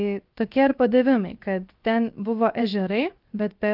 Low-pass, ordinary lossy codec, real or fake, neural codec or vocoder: 5.4 kHz; Opus, 32 kbps; fake; codec, 16 kHz, 0.7 kbps, FocalCodec